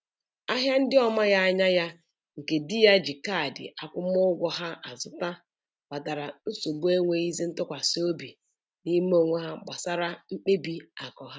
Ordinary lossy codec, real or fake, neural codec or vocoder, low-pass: none; real; none; none